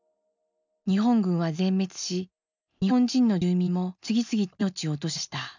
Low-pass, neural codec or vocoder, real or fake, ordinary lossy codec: 7.2 kHz; none; real; none